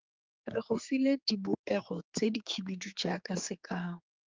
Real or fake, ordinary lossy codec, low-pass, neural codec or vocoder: fake; Opus, 32 kbps; 7.2 kHz; codec, 16 kHz, 4 kbps, X-Codec, HuBERT features, trained on general audio